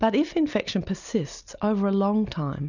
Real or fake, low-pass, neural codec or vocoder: real; 7.2 kHz; none